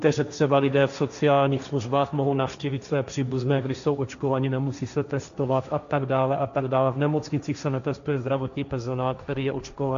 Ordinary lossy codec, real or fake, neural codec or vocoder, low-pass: AAC, 96 kbps; fake; codec, 16 kHz, 1.1 kbps, Voila-Tokenizer; 7.2 kHz